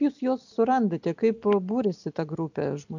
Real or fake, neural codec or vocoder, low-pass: real; none; 7.2 kHz